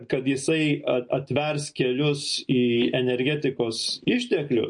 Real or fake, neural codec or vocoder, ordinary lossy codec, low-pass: real; none; MP3, 48 kbps; 9.9 kHz